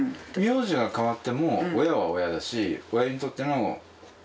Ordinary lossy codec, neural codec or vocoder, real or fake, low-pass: none; none; real; none